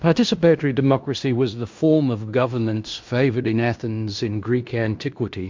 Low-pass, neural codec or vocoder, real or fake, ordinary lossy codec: 7.2 kHz; codec, 16 kHz in and 24 kHz out, 0.9 kbps, LongCat-Audio-Codec, fine tuned four codebook decoder; fake; MP3, 64 kbps